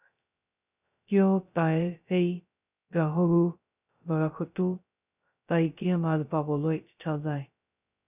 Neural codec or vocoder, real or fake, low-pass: codec, 16 kHz, 0.2 kbps, FocalCodec; fake; 3.6 kHz